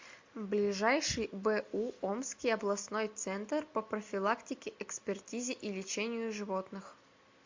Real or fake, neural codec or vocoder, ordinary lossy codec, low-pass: real; none; MP3, 48 kbps; 7.2 kHz